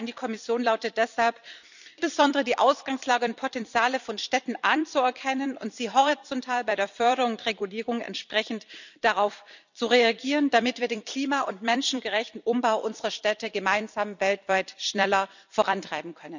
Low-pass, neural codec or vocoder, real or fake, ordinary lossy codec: 7.2 kHz; vocoder, 44.1 kHz, 128 mel bands every 256 samples, BigVGAN v2; fake; none